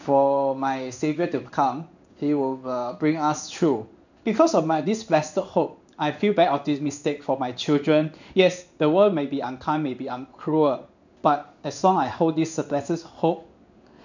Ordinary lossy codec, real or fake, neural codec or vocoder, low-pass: none; fake; codec, 16 kHz in and 24 kHz out, 1 kbps, XY-Tokenizer; 7.2 kHz